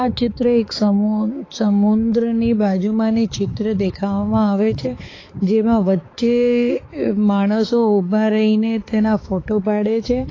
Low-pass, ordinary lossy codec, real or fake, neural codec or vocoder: 7.2 kHz; AAC, 32 kbps; fake; codec, 16 kHz, 4 kbps, X-Codec, HuBERT features, trained on balanced general audio